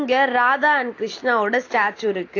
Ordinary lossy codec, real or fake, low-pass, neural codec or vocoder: AAC, 32 kbps; real; 7.2 kHz; none